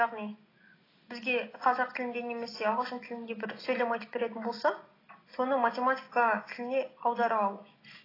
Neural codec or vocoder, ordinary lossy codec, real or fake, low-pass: none; AAC, 24 kbps; real; 5.4 kHz